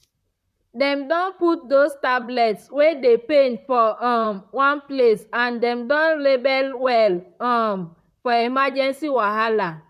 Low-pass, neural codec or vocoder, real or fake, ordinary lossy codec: 14.4 kHz; vocoder, 44.1 kHz, 128 mel bands, Pupu-Vocoder; fake; none